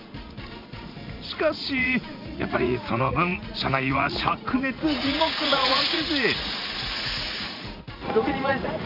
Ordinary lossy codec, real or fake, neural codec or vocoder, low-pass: MP3, 48 kbps; fake; vocoder, 44.1 kHz, 80 mel bands, Vocos; 5.4 kHz